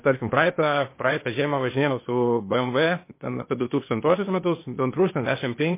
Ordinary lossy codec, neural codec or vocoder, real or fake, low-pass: MP3, 24 kbps; codec, 16 kHz, 0.8 kbps, ZipCodec; fake; 3.6 kHz